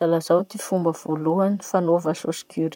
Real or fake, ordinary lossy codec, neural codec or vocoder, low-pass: fake; none; vocoder, 44.1 kHz, 128 mel bands, Pupu-Vocoder; 19.8 kHz